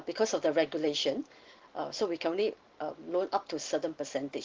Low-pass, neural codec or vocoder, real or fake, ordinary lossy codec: 7.2 kHz; none; real; Opus, 16 kbps